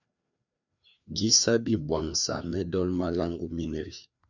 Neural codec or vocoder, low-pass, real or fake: codec, 16 kHz, 2 kbps, FreqCodec, larger model; 7.2 kHz; fake